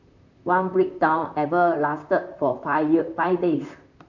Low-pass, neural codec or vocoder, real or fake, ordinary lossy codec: 7.2 kHz; vocoder, 44.1 kHz, 128 mel bands, Pupu-Vocoder; fake; none